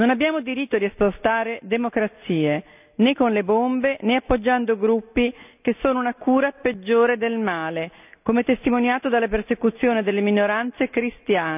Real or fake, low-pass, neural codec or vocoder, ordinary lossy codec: real; 3.6 kHz; none; none